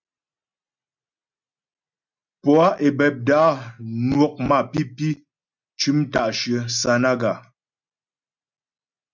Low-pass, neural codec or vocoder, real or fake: 7.2 kHz; none; real